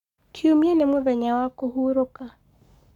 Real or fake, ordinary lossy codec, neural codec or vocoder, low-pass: fake; none; codec, 44.1 kHz, 7.8 kbps, Pupu-Codec; 19.8 kHz